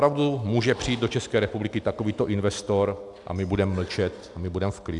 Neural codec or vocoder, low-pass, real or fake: none; 10.8 kHz; real